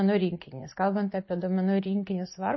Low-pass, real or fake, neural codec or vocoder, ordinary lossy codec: 7.2 kHz; real; none; MP3, 24 kbps